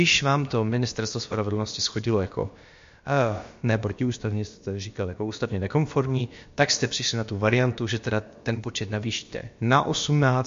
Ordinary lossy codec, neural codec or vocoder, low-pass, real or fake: MP3, 48 kbps; codec, 16 kHz, about 1 kbps, DyCAST, with the encoder's durations; 7.2 kHz; fake